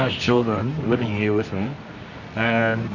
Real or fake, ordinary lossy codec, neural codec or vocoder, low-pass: fake; Opus, 64 kbps; codec, 24 kHz, 0.9 kbps, WavTokenizer, medium music audio release; 7.2 kHz